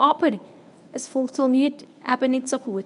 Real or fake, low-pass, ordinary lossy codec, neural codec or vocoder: fake; 10.8 kHz; none; codec, 24 kHz, 0.9 kbps, WavTokenizer, medium speech release version 1